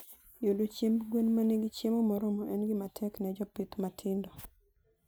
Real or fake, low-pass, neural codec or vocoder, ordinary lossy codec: real; none; none; none